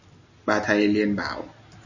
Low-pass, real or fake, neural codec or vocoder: 7.2 kHz; real; none